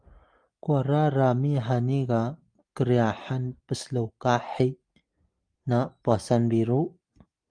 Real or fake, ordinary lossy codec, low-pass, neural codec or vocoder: real; Opus, 32 kbps; 9.9 kHz; none